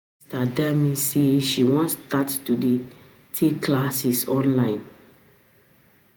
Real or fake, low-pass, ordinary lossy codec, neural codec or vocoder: fake; none; none; vocoder, 48 kHz, 128 mel bands, Vocos